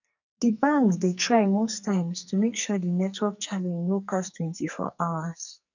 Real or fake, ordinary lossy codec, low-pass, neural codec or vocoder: fake; none; 7.2 kHz; codec, 44.1 kHz, 2.6 kbps, SNAC